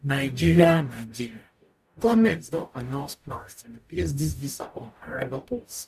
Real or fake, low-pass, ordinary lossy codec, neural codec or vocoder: fake; 14.4 kHz; none; codec, 44.1 kHz, 0.9 kbps, DAC